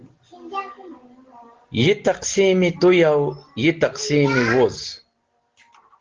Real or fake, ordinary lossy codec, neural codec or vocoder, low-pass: real; Opus, 16 kbps; none; 7.2 kHz